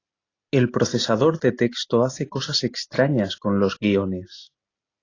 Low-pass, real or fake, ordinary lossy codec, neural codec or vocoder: 7.2 kHz; real; AAC, 32 kbps; none